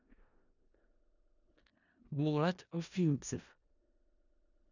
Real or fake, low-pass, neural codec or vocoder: fake; 7.2 kHz; codec, 16 kHz in and 24 kHz out, 0.4 kbps, LongCat-Audio-Codec, four codebook decoder